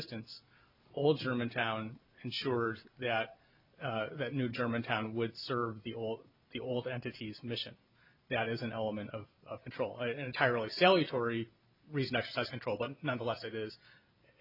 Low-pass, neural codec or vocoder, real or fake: 5.4 kHz; none; real